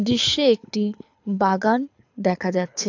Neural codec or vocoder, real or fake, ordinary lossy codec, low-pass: codec, 24 kHz, 6 kbps, HILCodec; fake; AAC, 48 kbps; 7.2 kHz